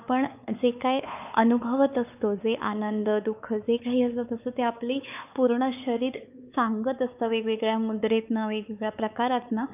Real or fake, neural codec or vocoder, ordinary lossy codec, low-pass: fake; codec, 16 kHz, 4 kbps, X-Codec, WavLM features, trained on Multilingual LibriSpeech; none; 3.6 kHz